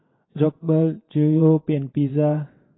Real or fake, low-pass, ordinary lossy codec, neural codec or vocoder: fake; 7.2 kHz; AAC, 16 kbps; vocoder, 22.05 kHz, 80 mel bands, WaveNeXt